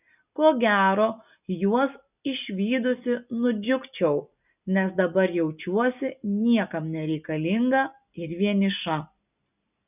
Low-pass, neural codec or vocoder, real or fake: 3.6 kHz; none; real